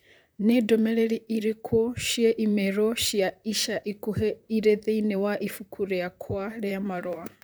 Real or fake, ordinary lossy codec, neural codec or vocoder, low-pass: fake; none; vocoder, 44.1 kHz, 128 mel bands, Pupu-Vocoder; none